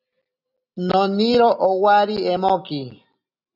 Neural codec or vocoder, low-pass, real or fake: none; 5.4 kHz; real